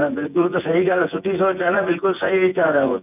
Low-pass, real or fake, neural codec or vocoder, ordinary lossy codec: 3.6 kHz; fake; vocoder, 24 kHz, 100 mel bands, Vocos; none